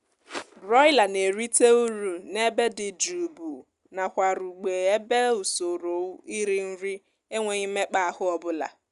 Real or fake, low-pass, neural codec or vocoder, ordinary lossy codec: real; 10.8 kHz; none; Opus, 64 kbps